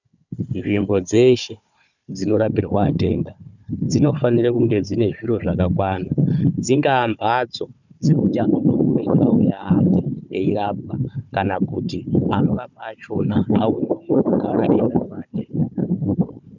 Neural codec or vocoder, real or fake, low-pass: codec, 16 kHz, 4 kbps, FunCodec, trained on Chinese and English, 50 frames a second; fake; 7.2 kHz